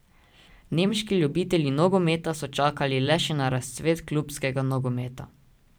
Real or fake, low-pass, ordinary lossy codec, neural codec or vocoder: fake; none; none; vocoder, 44.1 kHz, 128 mel bands every 512 samples, BigVGAN v2